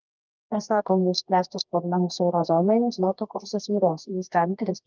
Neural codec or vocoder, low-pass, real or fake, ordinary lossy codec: codec, 24 kHz, 0.9 kbps, WavTokenizer, medium music audio release; 7.2 kHz; fake; Opus, 24 kbps